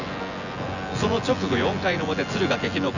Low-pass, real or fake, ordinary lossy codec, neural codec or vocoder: 7.2 kHz; fake; none; vocoder, 24 kHz, 100 mel bands, Vocos